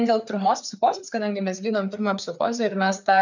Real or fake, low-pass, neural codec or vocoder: fake; 7.2 kHz; codec, 16 kHz, 4 kbps, FreqCodec, larger model